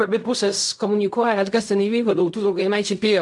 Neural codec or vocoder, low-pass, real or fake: codec, 16 kHz in and 24 kHz out, 0.4 kbps, LongCat-Audio-Codec, fine tuned four codebook decoder; 10.8 kHz; fake